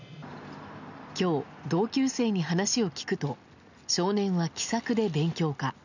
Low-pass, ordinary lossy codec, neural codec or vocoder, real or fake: 7.2 kHz; none; none; real